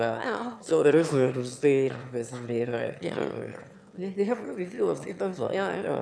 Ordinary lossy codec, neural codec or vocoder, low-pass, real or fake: none; autoencoder, 22.05 kHz, a latent of 192 numbers a frame, VITS, trained on one speaker; none; fake